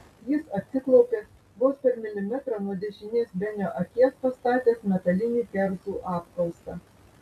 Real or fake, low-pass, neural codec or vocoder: real; 14.4 kHz; none